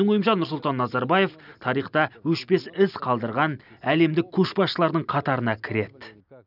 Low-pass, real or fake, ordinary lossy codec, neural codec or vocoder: 5.4 kHz; real; none; none